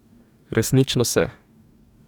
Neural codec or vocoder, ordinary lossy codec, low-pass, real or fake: codec, 44.1 kHz, 2.6 kbps, DAC; none; 19.8 kHz; fake